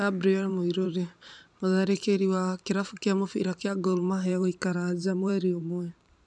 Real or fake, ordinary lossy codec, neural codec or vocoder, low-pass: fake; none; vocoder, 44.1 kHz, 128 mel bands every 512 samples, BigVGAN v2; 10.8 kHz